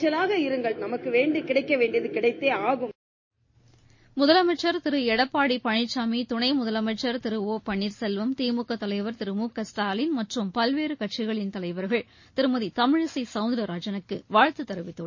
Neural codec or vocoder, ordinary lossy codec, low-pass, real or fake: none; MP3, 32 kbps; 7.2 kHz; real